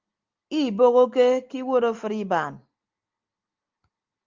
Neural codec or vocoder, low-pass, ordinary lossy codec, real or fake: none; 7.2 kHz; Opus, 32 kbps; real